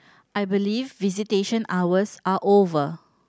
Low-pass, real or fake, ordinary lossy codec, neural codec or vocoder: none; real; none; none